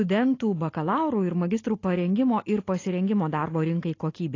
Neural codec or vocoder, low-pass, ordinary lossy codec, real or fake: none; 7.2 kHz; AAC, 32 kbps; real